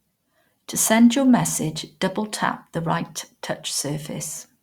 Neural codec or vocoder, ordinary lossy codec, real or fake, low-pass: none; Opus, 64 kbps; real; 19.8 kHz